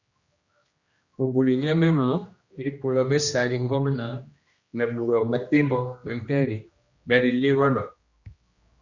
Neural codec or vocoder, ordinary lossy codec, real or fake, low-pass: codec, 16 kHz, 1 kbps, X-Codec, HuBERT features, trained on general audio; Opus, 64 kbps; fake; 7.2 kHz